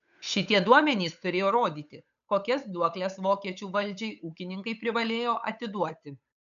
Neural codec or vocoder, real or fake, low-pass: codec, 16 kHz, 8 kbps, FunCodec, trained on Chinese and English, 25 frames a second; fake; 7.2 kHz